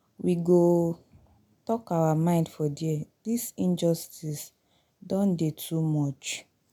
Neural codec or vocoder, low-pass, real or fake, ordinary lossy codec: none; 19.8 kHz; real; none